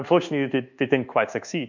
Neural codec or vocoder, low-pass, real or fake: codec, 24 kHz, 1.2 kbps, DualCodec; 7.2 kHz; fake